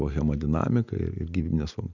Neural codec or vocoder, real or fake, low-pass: none; real; 7.2 kHz